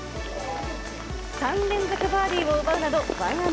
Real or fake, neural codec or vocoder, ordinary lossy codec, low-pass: real; none; none; none